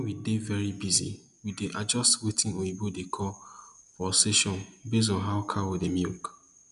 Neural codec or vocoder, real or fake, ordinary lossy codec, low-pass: none; real; none; 10.8 kHz